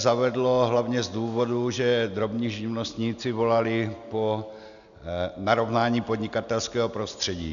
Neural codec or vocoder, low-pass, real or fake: none; 7.2 kHz; real